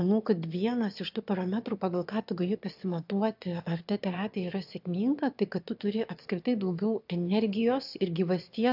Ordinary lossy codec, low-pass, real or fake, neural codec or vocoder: AAC, 48 kbps; 5.4 kHz; fake; autoencoder, 22.05 kHz, a latent of 192 numbers a frame, VITS, trained on one speaker